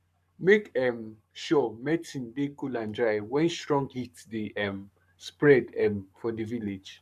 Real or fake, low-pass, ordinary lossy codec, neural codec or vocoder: fake; 14.4 kHz; none; codec, 44.1 kHz, 7.8 kbps, Pupu-Codec